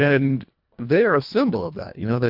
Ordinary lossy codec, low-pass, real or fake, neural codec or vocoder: MP3, 48 kbps; 5.4 kHz; fake; codec, 24 kHz, 1.5 kbps, HILCodec